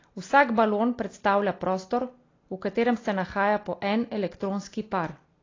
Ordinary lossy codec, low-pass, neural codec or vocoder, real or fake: AAC, 32 kbps; 7.2 kHz; none; real